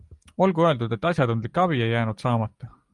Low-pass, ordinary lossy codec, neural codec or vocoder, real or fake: 10.8 kHz; Opus, 32 kbps; none; real